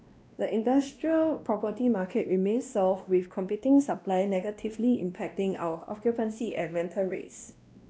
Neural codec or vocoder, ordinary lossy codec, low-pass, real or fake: codec, 16 kHz, 1 kbps, X-Codec, WavLM features, trained on Multilingual LibriSpeech; none; none; fake